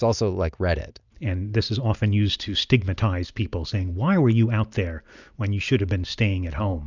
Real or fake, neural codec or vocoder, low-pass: real; none; 7.2 kHz